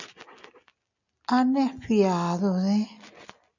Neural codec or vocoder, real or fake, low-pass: none; real; 7.2 kHz